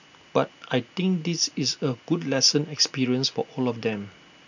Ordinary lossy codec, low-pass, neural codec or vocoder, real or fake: none; 7.2 kHz; none; real